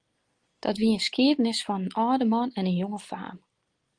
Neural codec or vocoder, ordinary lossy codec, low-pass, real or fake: none; Opus, 24 kbps; 9.9 kHz; real